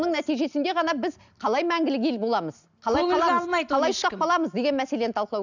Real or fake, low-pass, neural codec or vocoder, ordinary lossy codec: real; 7.2 kHz; none; none